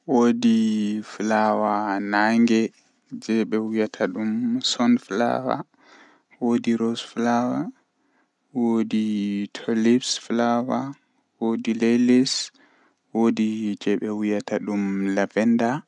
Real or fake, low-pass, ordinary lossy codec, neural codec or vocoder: real; 10.8 kHz; none; none